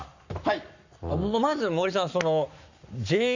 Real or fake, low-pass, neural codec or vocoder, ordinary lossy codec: fake; 7.2 kHz; codec, 44.1 kHz, 7.8 kbps, Pupu-Codec; none